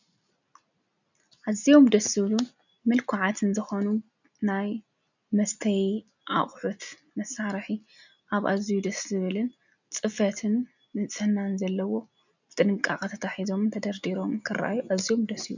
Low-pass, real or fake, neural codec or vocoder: 7.2 kHz; real; none